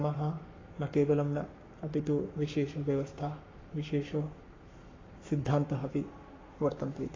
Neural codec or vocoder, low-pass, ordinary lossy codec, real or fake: codec, 44.1 kHz, 7.8 kbps, Pupu-Codec; 7.2 kHz; AAC, 32 kbps; fake